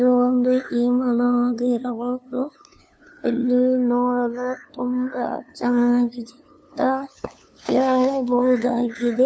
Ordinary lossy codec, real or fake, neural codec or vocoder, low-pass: none; fake; codec, 16 kHz, 2 kbps, FunCodec, trained on LibriTTS, 25 frames a second; none